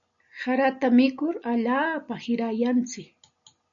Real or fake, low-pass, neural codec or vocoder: real; 7.2 kHz; none